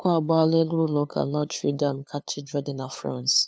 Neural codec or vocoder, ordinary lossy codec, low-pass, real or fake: codec, 16 kHz, 2 kbps, FunCodec, trained on LibriTTS, 25 frames a second; none; none; fake